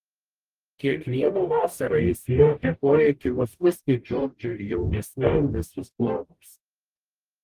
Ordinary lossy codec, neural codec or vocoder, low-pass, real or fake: Opus, 24 kbps; codec, 44.1 kHz, 0.9 kbps, DAC; 14.4 kHz; fake